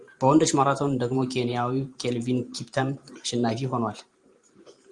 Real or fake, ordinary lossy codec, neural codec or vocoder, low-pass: fake; Opus, 32 kbps; vocoder, 44.1 kHz, 128 mel bands every 512 samples, BigVGAN v2; 10.8 kHz